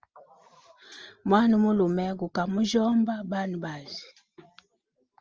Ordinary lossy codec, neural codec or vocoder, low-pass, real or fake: Opus, 24 kbps; none; 7.2 kHz; real